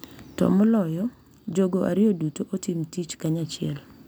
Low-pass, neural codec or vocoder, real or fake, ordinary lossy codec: none; none; real; none